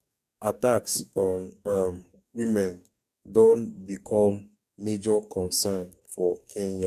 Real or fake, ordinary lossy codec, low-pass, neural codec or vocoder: fake; none; 14.4 kHz; codec, 44.1 kHz, 2.6 kbps, DAC